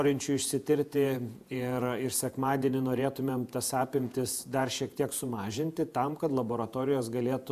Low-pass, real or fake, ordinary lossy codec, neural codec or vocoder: 14.4 kHz; fake; AAC, 96 kbps; vocoder, 48 kHz, 128 mel bands, Vocos